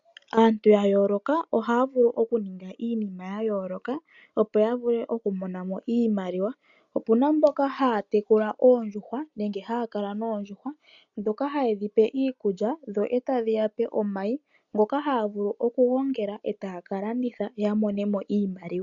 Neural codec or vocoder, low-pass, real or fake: none; 7.2 kHz; real